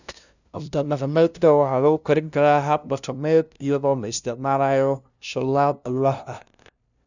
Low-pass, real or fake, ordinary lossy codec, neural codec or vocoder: 7.2 kHz; fake; none; codec, 16 kHz, 0.5 kbps, FunCodec, trained on LibriTTS, 25 frames a second